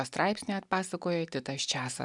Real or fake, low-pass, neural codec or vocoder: real; 10.8 kHz; none